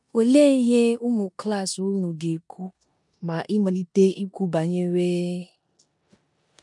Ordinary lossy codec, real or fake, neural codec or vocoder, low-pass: MP3, 64 kbps; fake; codec, 16 kHz in and 24 kHz out, 0.9 kbps, LongCat-Audio-Codec, four codebook decoder; 10.8 kHz